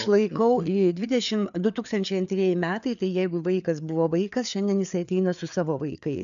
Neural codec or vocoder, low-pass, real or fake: codec, 16 kHz, 4 kbps, FreqCodec, larger model; 7.2 kHz; fake